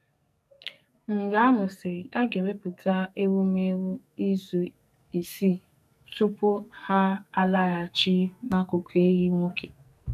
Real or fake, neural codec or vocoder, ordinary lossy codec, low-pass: fake; codec, 44.1 kHz, 2.6 kbps, SNAC; none; 14.4 kHz